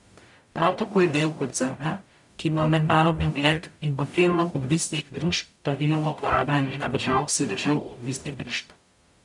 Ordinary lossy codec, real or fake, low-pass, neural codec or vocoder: none; fake; 10.8 kHz; codec, 44.1 kHz, 0.9 kbps, DAC